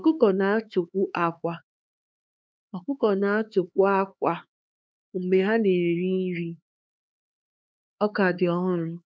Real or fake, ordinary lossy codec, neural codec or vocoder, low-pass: fake; none; codec, 16 kHz, 4 kbps, X-Codec, HuBERT features, trained on LibriSpeech; none